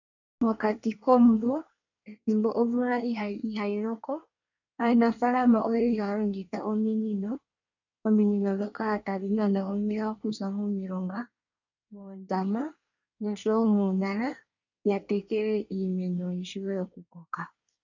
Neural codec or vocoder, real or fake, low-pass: codec, 24 kHz, 1 kbps, SNAC; fake; 7.2 kHz